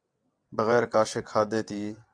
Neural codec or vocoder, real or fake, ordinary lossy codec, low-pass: vocoder, 22.05 kHz, 80 mel bands, WaveNeXt; fake; AAC, 64 kbps; 9.9 kHz